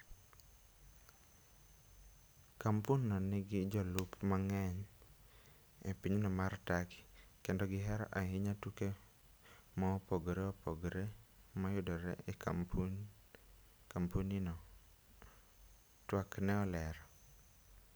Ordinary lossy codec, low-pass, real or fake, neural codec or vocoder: none; none; real; none